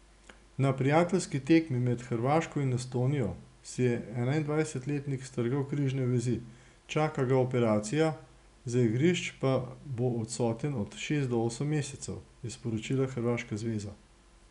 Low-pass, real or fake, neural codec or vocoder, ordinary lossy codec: 10.8 kHz; real; none; none